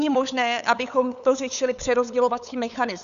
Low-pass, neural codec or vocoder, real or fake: 7.2 kHz; codec, 16 kHz, 8 kbps, FunCodec, trained on LibriTTS, 25 frames a second; fake